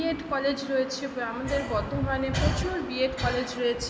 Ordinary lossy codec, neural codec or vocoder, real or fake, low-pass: none; none; real; none